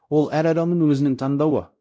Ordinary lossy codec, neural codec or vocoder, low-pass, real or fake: none; codec, 16 kHz, 0.5 kbps, X-Codec, WavLM features, trained on Multilingual LibriSpeech; none; fake